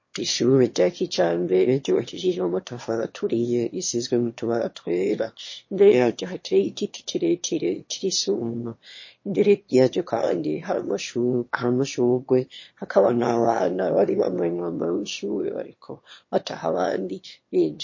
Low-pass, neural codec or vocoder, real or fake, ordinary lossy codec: 7.2 kHz; autoencoder, 22.05 kHz, a latent of 192 numbers a frame, VITS, trained on one speaker; fake; MP3, 32 kbps